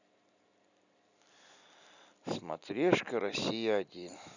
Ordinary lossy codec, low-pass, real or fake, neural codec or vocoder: none; 7.2 kHz; real; none